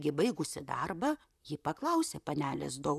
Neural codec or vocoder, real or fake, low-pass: vocoder, 44.1 kHz, 128 mel bands, Pupu-Vocoder; fake; 14.4 kHz